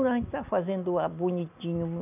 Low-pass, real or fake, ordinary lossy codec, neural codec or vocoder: 3.6 kHz; real; none; none